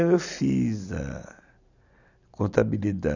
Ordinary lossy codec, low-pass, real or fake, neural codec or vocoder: none; 7.2 kHz; real; none